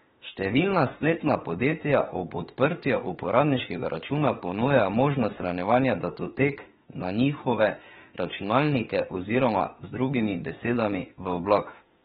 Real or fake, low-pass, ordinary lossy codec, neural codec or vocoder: fake; 19.8 kHz; AAC, 16 kbps; autoencoder, 48 kHz, 32 numbers a frame, DAC-VAE, trained on Japanese speech